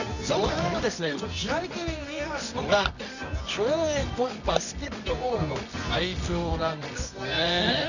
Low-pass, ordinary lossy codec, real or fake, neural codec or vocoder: 7.2 kHz; none; fake; codec, 24 kHz, 0.9 kbps, WavTokenizer, medium music audio release